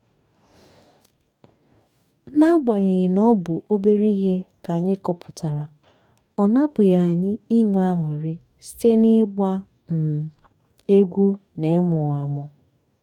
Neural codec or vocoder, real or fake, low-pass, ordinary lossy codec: codec, 44.1 kHz, 2.6 kbps, DAC; fake; 19.8 kHz; none